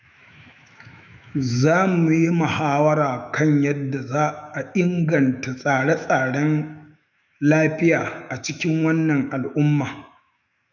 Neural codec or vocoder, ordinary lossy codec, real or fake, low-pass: autoencoder, 48 kHz, 128 numbers a frame, DAC-VAE, trained on Japanese speech; none; fake; 7.2 kHz